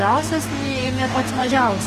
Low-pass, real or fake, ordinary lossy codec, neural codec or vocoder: 14.4 kHz; fake; Opus, 24 kbps; codec, 44.1 kHz, 2.6 kbps, DAC